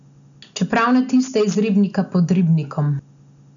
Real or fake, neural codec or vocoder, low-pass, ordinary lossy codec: real; none; 7.2 kHz; none